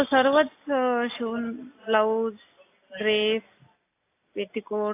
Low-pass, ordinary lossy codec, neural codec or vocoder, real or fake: 3.6 kHz; AAC, 24 kbps; none; real